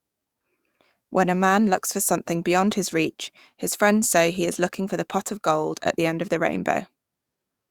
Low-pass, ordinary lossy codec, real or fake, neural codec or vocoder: 19.8 kHz; Opus, 64 kbps; fake; codec, 44.1 kHz, 7.8 kbps, DAC